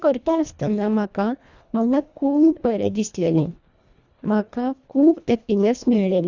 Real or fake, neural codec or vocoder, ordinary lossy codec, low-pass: fake; codec, 24 kHz, 1.5 kbps, HILCodec; Opus, 64 kbps; 7.2 kHz